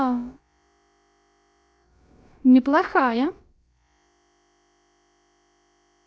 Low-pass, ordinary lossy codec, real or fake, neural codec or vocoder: none; none; fake; codec, 16 kHz, about 1 kbps, DyCAST, with the encoder's durations